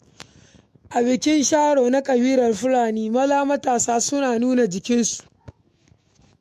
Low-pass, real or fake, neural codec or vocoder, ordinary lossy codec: 14.4 kHz; fake; autoencoder, 48 kHz, 128 numbers a frame, DAC-VAE, trained on Japanese speech; MP3, 64 kbps